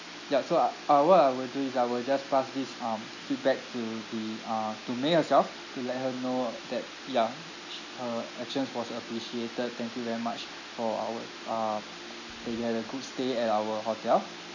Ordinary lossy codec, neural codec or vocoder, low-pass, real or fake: none; none; 7.2 kHz; real